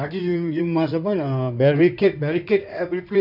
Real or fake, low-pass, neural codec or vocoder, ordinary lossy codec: fake; 5.4 kHz; codec, 16 kHz in and 24 kHz out, 2.2 kbps, FireRedTTS-2 codec; none